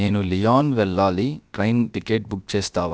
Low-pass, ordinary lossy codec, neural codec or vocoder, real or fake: none; none; codec, 16 kHz, about 1 kbps, DyCAST, with the encoder's durations; fake